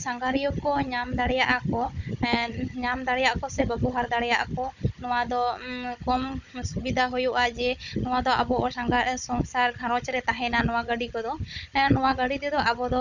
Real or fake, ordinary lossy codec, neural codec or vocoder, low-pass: fake; none; codec, 16 kHz, 16 kbps, FunCodec, trained on Chinese and English, 50 frames a second; 7.2 kHz